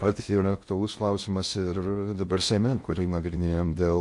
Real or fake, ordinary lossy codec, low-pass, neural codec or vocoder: fake; MP3, 48 kbps; 10.8 kHz; codec, 16 kHz in and 24 kHz out, 0.6 kbps, FocalCodec, streaming, 4096 codes